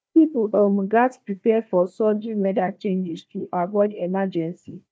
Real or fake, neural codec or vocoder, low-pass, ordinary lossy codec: fake; codec, 16 kHz, 1 kbps, FunCodec, trained on Chinese and English, 50 frames a second; none; none